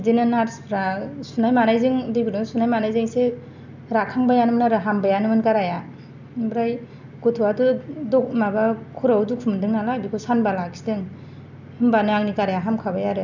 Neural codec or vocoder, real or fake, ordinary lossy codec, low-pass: none; real; none; 7.2 kHz